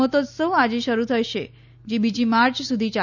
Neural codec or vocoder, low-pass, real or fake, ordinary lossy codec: none; 7.2 kHz; real; none